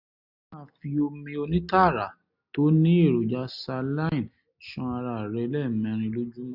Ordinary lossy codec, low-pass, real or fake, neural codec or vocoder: none; 5.4 kHz; real; none